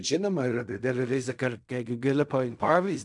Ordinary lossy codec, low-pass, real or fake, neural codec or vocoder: MP3, 96 kbps; 10.8 kHz; fake; codec, 16 kHz in and 24 kHz out, 0.4 kbps, LongCat-Audio-Codec, fine tuned four codebook decoder